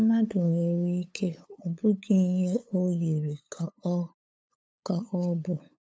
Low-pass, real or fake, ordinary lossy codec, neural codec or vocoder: none; fake; none; codec, 16 kHz, 8 kbps, FunCodec, trained on LibriTTS, 25 frames a second